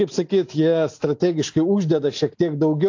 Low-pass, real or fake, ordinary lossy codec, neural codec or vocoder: 7.2 kHz; real; AAC, 48 kbps; none